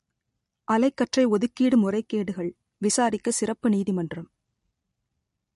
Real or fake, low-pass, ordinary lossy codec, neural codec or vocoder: real; 14.4 kHz; MP3, 48 kbps; none